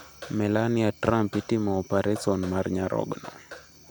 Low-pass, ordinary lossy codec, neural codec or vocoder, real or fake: none; none; none; real